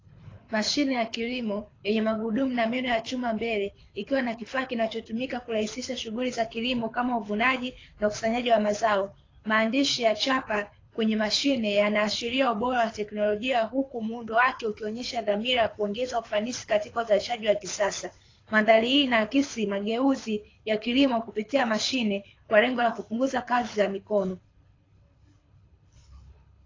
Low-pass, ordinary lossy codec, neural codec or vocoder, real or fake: 7.2 kHz; AAC, 32 kbps; codec, 24 kHz, 6 kbps, HILCodec; fake